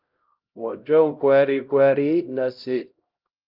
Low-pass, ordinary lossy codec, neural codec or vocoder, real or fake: 5.4 kHz; Opus, 24 kbps; codec, 16 kHz, 0.5 kbps, X-Codec, HuBERT features, trained on LibriSpeech; fake